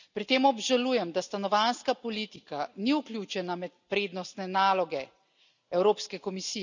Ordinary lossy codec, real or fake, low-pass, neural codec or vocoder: none; real; 7.2 kHz; none